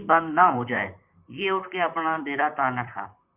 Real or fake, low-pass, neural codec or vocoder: fake; 3.6 kHz; vocoder, 44.1 kHz, 128 mel bands, Pupu-Vocoder